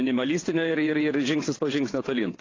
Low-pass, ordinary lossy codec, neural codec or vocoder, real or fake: 7.2 kHz; AAC, 32 kbps; vocoder, 22.05 kHz, 80 mel bands, WaveNeXt; fake